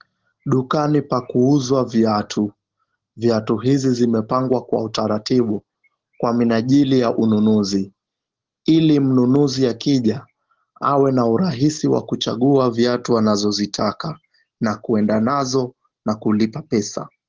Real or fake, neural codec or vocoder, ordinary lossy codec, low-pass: real; none; Opus, 16 kbps; 7.2 kHz